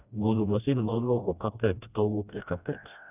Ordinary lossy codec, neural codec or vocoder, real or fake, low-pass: none; codec, 16 kHz, 1 kbps, FreqCodec, smaller model; fake; 3.6 kHz